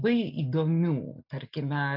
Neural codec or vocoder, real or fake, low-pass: none; real; 5.4 kHz